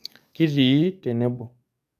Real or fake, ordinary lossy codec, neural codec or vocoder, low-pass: fake; none; codec, 44.1 kHz, 7.8 kbps, DAC; 14.4 kHz